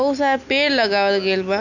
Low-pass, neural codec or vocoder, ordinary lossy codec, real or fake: 7.2 kHz; none; none; real